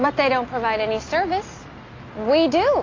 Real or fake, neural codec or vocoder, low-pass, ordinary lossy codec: real; none; 7.2 kHz; AAC, 32 kbps